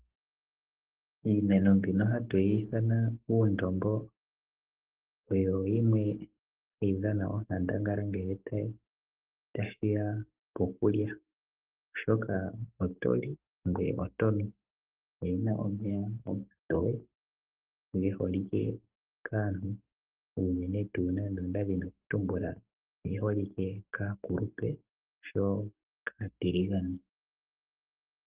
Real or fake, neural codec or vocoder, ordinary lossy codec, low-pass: fake; codec, 44.1 kHz, 7.8 kbps, Pupu-Codec; Opus, 16 kbps; 3.6 kHz